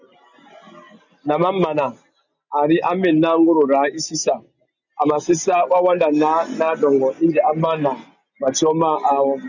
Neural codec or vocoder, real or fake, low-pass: none; real; 7.2 kHz